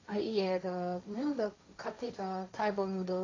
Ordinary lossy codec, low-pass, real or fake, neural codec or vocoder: AAC, 32 kbps; 7.2 kHz; fake; codec, 16 kHz, 1.1 kbps, Voila-Tokenizer